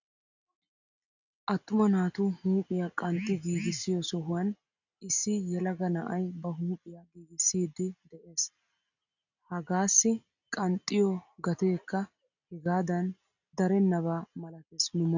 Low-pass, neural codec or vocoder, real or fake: 7.2 kHz; none; real